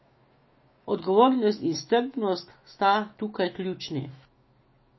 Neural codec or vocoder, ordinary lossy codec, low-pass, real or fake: autoencoder, 48 kHz, 128 numbers a frame, DAC-VAE, trained on Japanese speech; MP3, 24 kbps; 7.2 kHz; fake